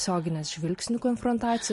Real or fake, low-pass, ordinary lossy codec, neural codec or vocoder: real; 14.4 kHz; MP3, 48 kbps; none